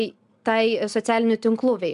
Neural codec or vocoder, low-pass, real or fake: none; 10.8 kHz; real